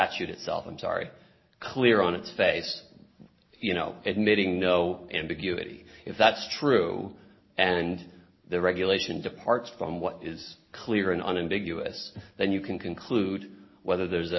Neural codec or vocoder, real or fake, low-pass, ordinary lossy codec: none; real; 7.2 kHz; MP3, 24 kbps